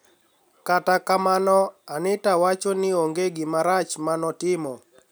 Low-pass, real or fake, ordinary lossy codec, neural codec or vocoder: none; real; none; none